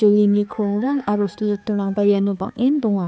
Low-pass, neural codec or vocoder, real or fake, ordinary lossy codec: none; codec, 16 kHz, 4 kbps, X-Codec, HuBERT features, trained on balanced general audio; fake; none